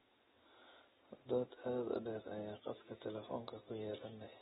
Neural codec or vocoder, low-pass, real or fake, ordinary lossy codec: none; 14.4 kHz; real; AAC, 16 kbps